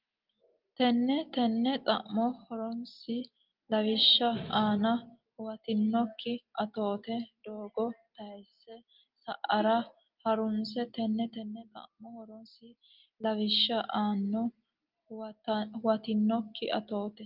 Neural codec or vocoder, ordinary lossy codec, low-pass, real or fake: none; Opus, 24 kbps; 5.4 kHz; real